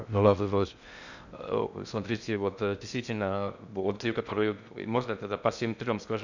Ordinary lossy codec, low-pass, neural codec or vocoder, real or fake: none; 7.2 kHz; codec, 16 kHz in and 24 kHz out, 0.6 kbps, FocalCodec, streaming, 2048 codes; fake